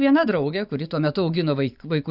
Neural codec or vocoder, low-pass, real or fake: vocoder, 24 kHz, 100 mel bands, Vocos; 5.4 kHz; fake